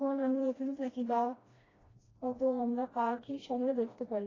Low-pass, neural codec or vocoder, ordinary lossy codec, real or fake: 7.2 kHz; codec, 16 kHz, 1 kbps, FreqCodec, smaller model; AAC, 32 kbps; fake